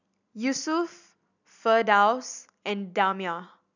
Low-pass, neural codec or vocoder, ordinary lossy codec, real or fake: 7.2 kHz; none; none; real